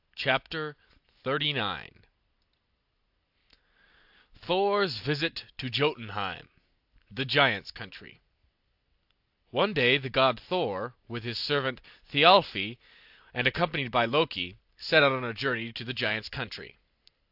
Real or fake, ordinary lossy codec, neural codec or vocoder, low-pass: real; AAC, 48 kbps; none; 5.4 kHz